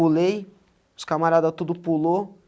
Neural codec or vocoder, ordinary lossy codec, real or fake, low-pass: none; none; real; none